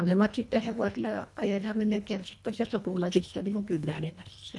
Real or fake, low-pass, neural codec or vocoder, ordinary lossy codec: fake; none; codec, 24 kHz, 1.5 kbps, HILCodec; none